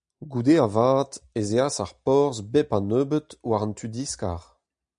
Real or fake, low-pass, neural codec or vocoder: real; 9.9 kHz; none